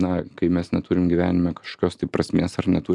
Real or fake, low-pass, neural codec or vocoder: real; 10.8 kHz; none